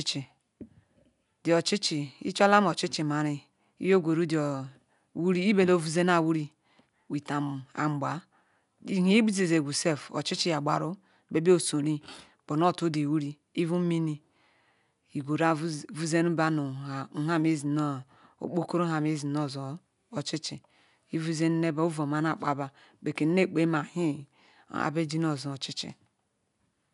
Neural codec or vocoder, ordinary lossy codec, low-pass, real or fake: none; none; 10.8 kHz; real